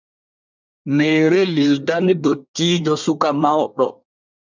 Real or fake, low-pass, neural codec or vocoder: fake; 7.2 kHz; codec, 24 kHz, 1 kbps, SNAC